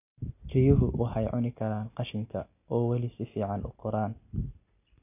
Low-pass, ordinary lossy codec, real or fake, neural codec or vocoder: 3.6 kHz; AAC, 32 kbps; real; none